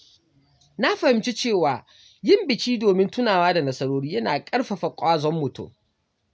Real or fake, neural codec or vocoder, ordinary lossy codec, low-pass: real; none; none; none